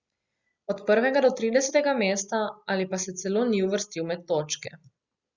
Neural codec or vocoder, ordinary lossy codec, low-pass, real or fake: none; Opus, 64 kbps; 7.2 kHz; real